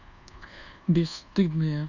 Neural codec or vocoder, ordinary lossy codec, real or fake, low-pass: codec, 24 kHz, 1.2 kbps, DualCodec; none; fake; 7.2 kHz